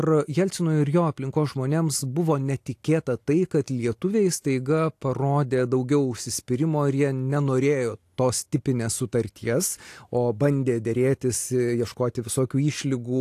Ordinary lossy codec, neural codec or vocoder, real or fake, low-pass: AAC, 64 kbps; none; real; 14.4 kHz